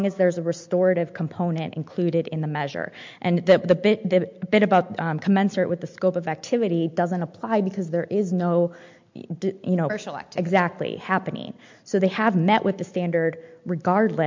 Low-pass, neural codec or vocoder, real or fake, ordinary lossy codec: 7.2 kHz; none; real; MP3, 64 kbps